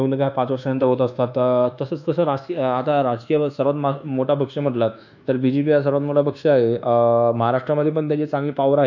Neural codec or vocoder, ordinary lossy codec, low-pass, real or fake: codec, 24 kHz, 1.2 kbps, DualCodec; none; 7.2 kHz; fake